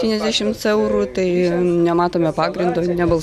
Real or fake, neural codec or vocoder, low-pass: real; none; 14.4 kHz